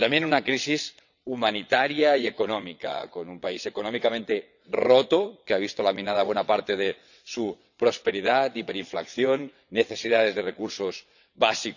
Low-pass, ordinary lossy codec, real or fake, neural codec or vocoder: 7.2 kHz; none; fake; vocoder, 22.05 kHz, 80 mel bands, WaveNeXt